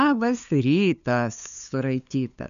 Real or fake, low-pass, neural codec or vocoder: fake; 7.2 kHz; codec, 16 kHz, 2 kbps, FunCodec, trained on LibriTTS, 25 frames a second